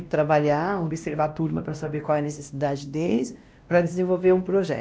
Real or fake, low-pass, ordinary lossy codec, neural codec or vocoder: fake; none; none; codec, 16 kHz, 1 kbps, X-Codec, WavLM features, trained on Multilingual LibriSpeech